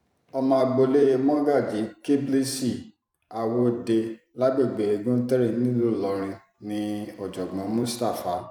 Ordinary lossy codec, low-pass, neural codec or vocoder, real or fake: none; 19.8 kHz; vocoder, 44.1 kHz, 128 mel bands every 512 samples, BigVGAN v2; fake